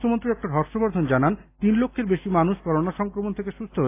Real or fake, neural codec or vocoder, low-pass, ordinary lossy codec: real; none; 3.6 kHz; AAC, 24 kbps